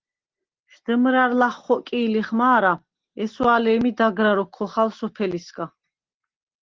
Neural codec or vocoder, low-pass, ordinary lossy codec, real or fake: none; 7.2 kHz; Opus, 16 kbps; real